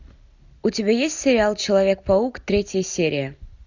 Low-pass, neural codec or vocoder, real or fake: 7.2 kHz; none; real